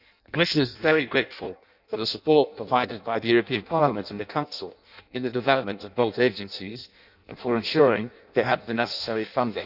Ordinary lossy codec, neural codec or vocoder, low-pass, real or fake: none; codec, 16 kHz in and 24 kHz out, 0.6 kbps, FireRedTTS-2 codec; 5.4 kHz; fake